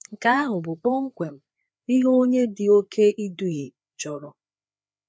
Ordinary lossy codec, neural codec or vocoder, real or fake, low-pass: none; codec, 16 kHz, 4 kbps, FreqCodec, larger model; fake; none